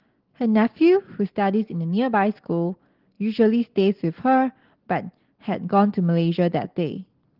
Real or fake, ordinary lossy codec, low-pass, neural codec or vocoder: real; Opus, 16 kbps; 5.4 kHz; none